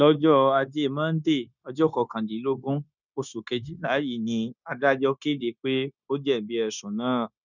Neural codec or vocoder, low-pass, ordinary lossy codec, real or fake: codec, 16 kHz, 0.9 kbps, LongCat-Audio-Codec; 7.2 kHz; none; fake